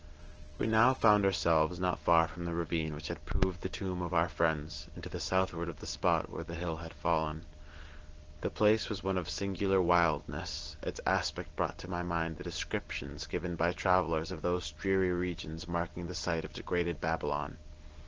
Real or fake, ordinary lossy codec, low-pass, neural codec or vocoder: real; Opus, 16 kbps; 7.2 kHz; none